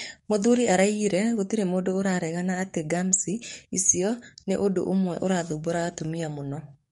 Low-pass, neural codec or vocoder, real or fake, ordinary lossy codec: 19.8 kHz; codec, 44.1 kHz, 7.8 kbps, DAC; fake; MP3, 48 kbps